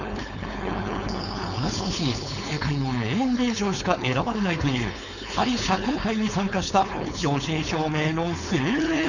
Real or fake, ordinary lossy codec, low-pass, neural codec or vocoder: fake; none; 7.2 kHz; codec, 16 kHz, 4.8 kbps, FACodec